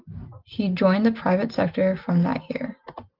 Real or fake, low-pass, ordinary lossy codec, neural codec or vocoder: real; 5.4 kHz; Opus, 24 kbps; none